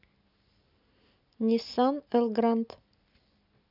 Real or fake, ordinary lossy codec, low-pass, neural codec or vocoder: real; none; 5.4 kHz; none